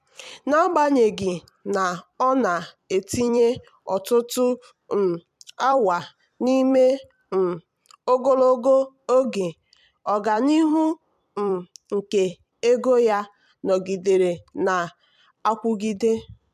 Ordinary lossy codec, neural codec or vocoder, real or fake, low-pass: MP3, 96 kbps; vocoder, 44.1 kHz, 128 mel bands every 256 samples, BigVGAN v2; fake; 14.4 kHz